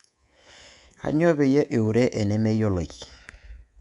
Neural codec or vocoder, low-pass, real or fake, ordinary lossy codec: codec, 24 kHz, 3.1 kbps, DualCodec; 10.8 kHz; fake; none